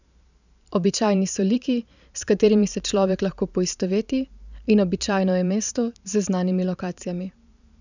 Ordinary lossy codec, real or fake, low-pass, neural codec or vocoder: none; fake; 7.2 kHz; vocoder, 44.1 kHz, 128 mel bands every 512 samples, BigVGAN v2